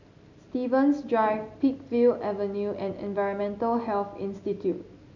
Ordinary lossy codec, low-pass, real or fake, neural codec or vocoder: none; 7.2 kHz; real; none